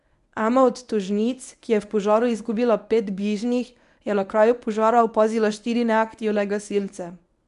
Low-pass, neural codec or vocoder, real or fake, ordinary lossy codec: 10.8 kHz; codec, 24 kHz, 0.9 kbps, WavTokenizer, medium speech release version 1; fake; none